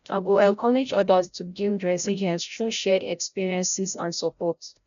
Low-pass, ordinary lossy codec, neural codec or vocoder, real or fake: 7.2 kHz; none; codec, 16 kHz, 0.5 kbps, FreqCodec, larger model; fake